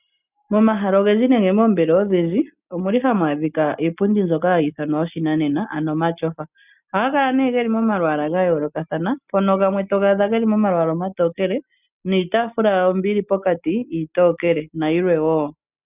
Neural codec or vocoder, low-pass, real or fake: none; 3.6 kHz; real